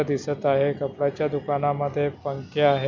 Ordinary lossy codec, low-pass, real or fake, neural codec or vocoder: none; 7.2 kHz; real; none